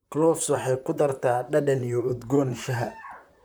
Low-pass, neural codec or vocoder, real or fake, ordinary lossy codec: none; vocoder, 44.1 kHz, 128 mel bands, Pupu-Vocoder; fake; none